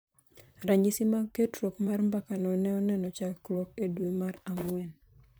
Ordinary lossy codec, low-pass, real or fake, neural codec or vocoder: none; none; fake; vocoder, 44.1 kHz, 128 mel bands, Pupu-Vocoder